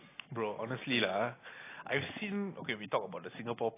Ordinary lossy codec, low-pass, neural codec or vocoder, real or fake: AAC, 16 kbps; 3.6 kHz; none; real